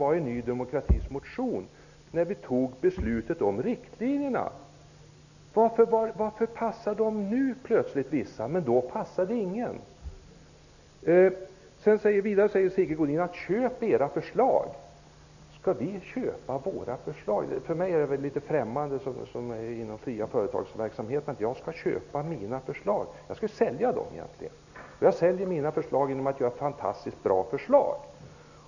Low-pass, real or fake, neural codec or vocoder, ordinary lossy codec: 7.2 kHz; real; none; none